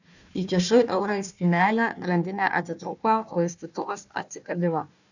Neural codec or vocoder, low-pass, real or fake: codec, 16 kHz, 1 kbps, FunCodec, trained on Chinese and English, 50 frames a second; 7.2 kHz; fake